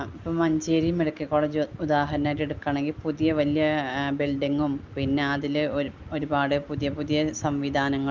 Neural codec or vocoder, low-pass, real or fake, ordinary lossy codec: none; 7.2 kHz; real; Opus, 32 kbps